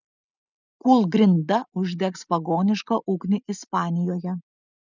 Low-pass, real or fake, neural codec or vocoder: 7.2 kHz; real; none